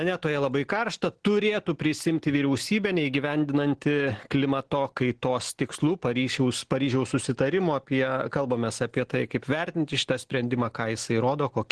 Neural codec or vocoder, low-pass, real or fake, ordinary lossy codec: none; 10.8 kHz; real; Opus, 16 kbps